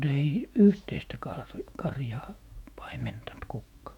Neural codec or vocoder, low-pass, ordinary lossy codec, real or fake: autoencoder, 48 kHz, 128 numbers a frame, DAC-VAE, trained on Japanese speech; 19.8 kHz; MP3, 96 kbps; fake